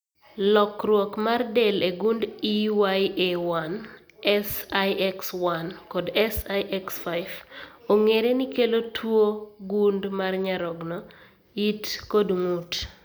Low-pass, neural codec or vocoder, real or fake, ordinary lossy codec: none; none; real; none